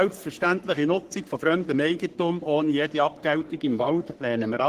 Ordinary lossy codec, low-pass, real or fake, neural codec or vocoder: Opus, 16 kbps; 14.4 kHz; fake; codec, 44.1 kHz, 3.4 kbps, Pupu-Codec